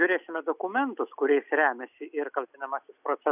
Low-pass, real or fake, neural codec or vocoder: 3.6 kHz; real; none